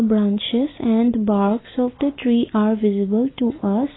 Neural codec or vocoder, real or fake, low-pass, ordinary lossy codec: none; real; 7.2 kHz; AAC, 16 kbps